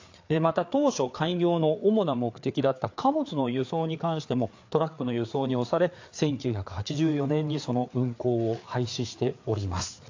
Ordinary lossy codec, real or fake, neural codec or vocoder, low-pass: AAC, 48 kbps; fake; codec, 16 kHz, 4 kbps, FreqCodec, larger model; 7.2 kHz